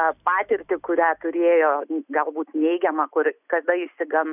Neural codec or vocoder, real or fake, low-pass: none; real; 3.6 kHz